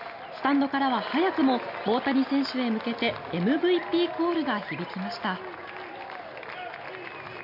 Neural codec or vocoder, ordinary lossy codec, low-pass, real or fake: none; none; 5.4 kHz; real